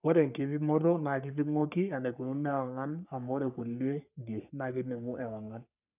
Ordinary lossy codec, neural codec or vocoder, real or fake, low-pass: none; codec, 44.1 kHz, 3.4 kbps, Pupu-Codec; fake; 3.6 kHz